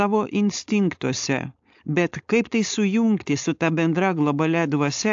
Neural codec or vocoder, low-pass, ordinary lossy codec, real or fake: codec, 16 kHz, 4.8 kbps, FACodec; 7.2 kHz; AAC, 64 kbps; fake